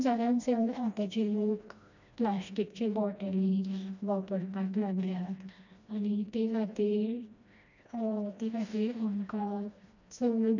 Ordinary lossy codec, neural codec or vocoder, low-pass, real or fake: none; codec, 16 kHz, 1 kbps, FreqCodec, smaller model; 7.2 kHz; fake